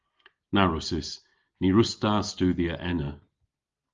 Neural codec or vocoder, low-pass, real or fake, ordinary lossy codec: none; 7.2 kHz; real; Opus, 32 kbps